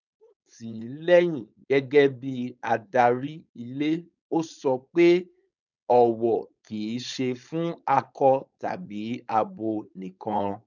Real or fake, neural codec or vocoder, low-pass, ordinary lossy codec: fake; codec, 16 kHz, 4.8 kbps, FACodec; 7.2 kHz; none